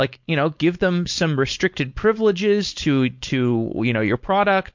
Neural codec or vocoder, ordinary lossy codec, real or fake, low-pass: codec, 16 kHz, 4.8 kbps, FACodec; MP3, 48 kbps; fake; 7.2 kHz